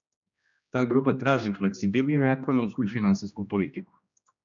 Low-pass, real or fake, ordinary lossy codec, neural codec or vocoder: 7.2 kHz; fake; MP3, 96 kbps; codec, 16 kHz, 1 kbps, X-Codec, HuBERT features, trained on general audio